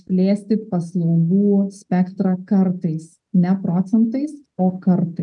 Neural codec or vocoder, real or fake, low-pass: none; real; 10.8 kHz